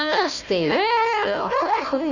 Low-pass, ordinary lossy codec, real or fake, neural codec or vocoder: 7.2 kHz; none; fake; codec, 16 kHz, 1 kbps, FunCodec, trained on Chinese and English, 50 frames a second